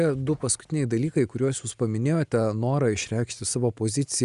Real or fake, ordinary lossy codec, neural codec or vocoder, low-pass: real; Opus, 64 kbps; none; 10.8 kHz